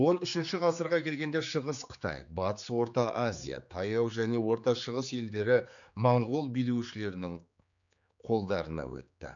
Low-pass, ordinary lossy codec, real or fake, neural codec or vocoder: 7.2 kHz; none; fake; codec, 16 kHz, 4 kbps, X-Codec, HuBERT features, trained on general audio